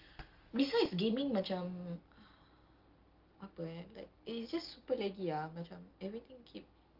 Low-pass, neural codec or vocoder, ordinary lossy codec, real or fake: 5.4 kHz; none; Opus, 24 kbps; real